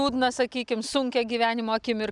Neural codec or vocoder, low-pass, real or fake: none; 10.8 kHz; real